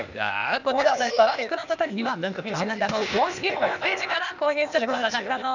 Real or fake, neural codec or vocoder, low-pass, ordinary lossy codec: fake; codec, 16 kHz, 0.8 kbps, ZipCodec; 7.2 kHz; none